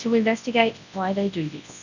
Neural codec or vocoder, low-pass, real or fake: codec, 24 kHz, 0.9 kbps, WavTokenizer, large speech release; 7.2 kHz; fake